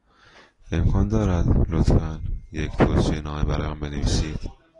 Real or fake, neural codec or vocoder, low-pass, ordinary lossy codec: real; none; 10.8 kHz; AAC, 32 kbps